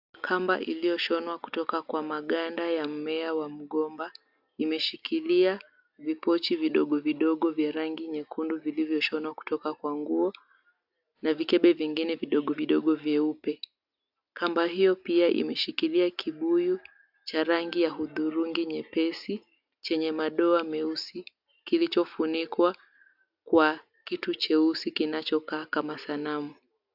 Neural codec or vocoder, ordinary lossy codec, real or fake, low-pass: none; AAC, 48 kbps; real; 5.4 kHz